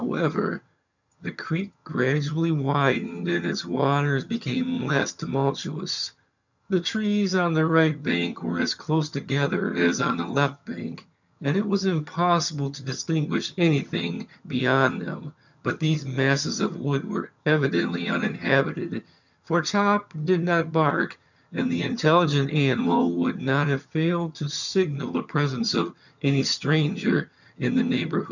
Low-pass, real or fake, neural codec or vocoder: 7.2 kHz; fake; vocoder, 22.05 kHz, 80 mel bands, HiFi-GAN